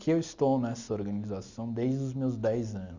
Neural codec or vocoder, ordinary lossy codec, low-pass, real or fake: none; Opus, 64 kbps; 7.2 kHz; real